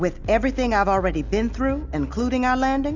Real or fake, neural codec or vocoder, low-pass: real; none; 7.2 kHz